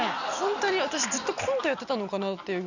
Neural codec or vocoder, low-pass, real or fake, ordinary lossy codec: none; 7.2 kHz; real; none